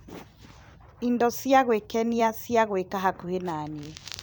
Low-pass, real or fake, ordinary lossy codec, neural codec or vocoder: none; fake; none; vocoder, 44.1 kHz, 128 mel bands every 256 samples, BigVGAN v2